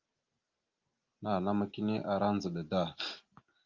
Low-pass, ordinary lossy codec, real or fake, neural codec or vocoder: 7.2 kHz; Opus, 32 kbps; real; none